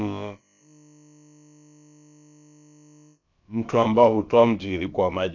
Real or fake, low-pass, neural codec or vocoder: fake; 7.2 kHz; codec, 16 kHz, about 1 kbps, DyCAST, with the encoder's durations